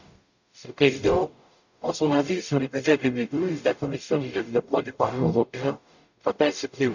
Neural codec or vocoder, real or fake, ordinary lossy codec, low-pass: codec, 44.1 kHz, 0.9 kbps, DAC; fake; none; 7.2 kHz